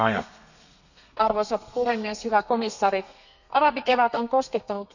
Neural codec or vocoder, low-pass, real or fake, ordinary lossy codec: codec, 32 kHz, 1.9 kbps, SNAC; 7.2 kHz; fake; none